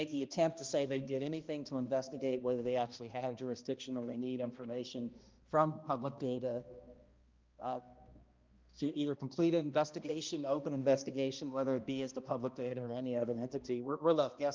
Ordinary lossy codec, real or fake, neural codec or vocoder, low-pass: Opus, 24 kbps; fake; codec, 16 kHz, 1 kbps, X-Codec, HuBERT features, trained on balanced general audio; 7.2 kHz